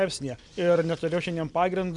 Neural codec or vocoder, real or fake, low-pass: none; real; 10.8 kHz